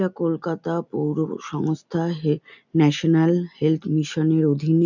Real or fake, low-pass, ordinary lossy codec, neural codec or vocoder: real; 7.2 kHz; none; none